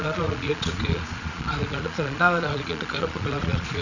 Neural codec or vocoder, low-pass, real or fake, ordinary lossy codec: vocoder, 22.05 kHz, 80 mel bands, Vocos; 7.2 kHz; fake; none